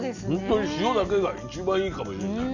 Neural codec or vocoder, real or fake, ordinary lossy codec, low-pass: none; real; none; 7.2 kHz